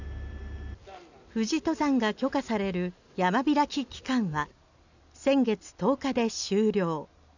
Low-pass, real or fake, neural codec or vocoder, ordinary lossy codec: 7.2 kHz; real; none; none